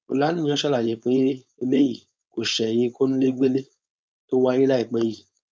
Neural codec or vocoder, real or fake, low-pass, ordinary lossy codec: codec, 16 kHz, 4.8 kbps, FACodec; fake; none; none